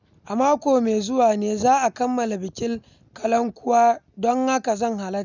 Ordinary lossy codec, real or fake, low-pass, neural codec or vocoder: none; real; 7.2 kHz; none